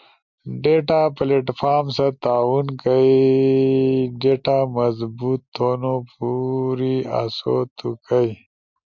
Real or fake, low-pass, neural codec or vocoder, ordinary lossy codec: real; 7.2 kHz; none; MP3, 64 kbps